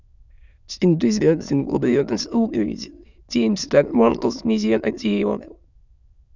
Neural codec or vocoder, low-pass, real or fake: autoencoder, 22.05 kHz, a latent of 192 numbers a frame, VITS, trained on many speakers; 7.2 kHz; fake